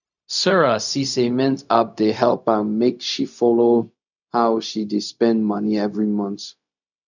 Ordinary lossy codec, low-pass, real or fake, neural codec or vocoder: none; 7.2 kHz; fake; codec, 16 kHz, 0.4 kbps, LongCat-Audio-Codec